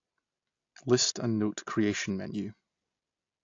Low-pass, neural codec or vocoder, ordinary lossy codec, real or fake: 7.2 kHz; none; AAC, 48 kbps; real